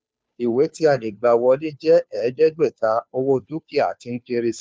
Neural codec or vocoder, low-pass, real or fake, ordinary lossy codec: codec, 16 kHz, 2 kbps, FunCodec, trained on Chinese and English, 25 frames a second; none; fake; none